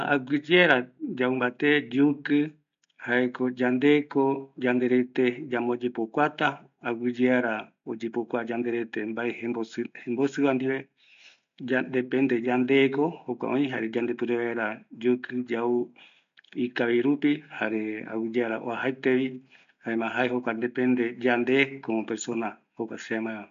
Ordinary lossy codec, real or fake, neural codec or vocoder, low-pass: MP3, 64 kbps; real; none; 7.2 kHz